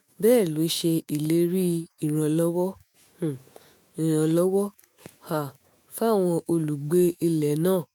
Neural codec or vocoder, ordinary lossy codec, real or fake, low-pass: autoencoder, 48 kHz, 128 numbers a frame, DAC-VAE, trained on Japanese speech; MP3, 96 kbps; fake; 19.8 kHz